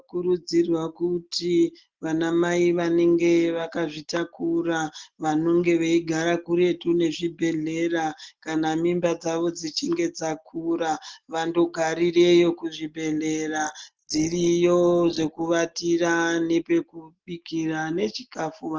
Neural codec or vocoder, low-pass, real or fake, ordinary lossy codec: none; 7.2 kHz; real; Opus, 16 kbps